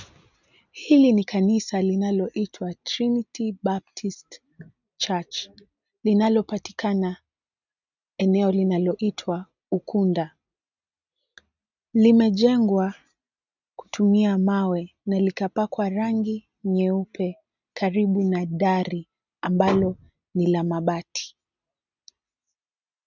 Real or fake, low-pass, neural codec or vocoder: real; 7.2 kHz; none